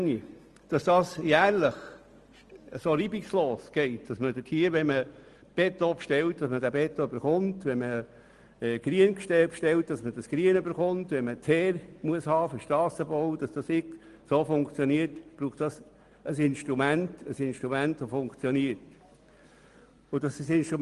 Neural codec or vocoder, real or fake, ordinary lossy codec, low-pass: none; real; Opus, 24 kbps; 10.8 kHz